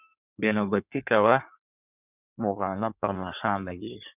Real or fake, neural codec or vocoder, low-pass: fake; codec, 16 kHz in and 24 kHz out, 1.1 kbps, FireRedTTS-2 codec; 3.6 kHz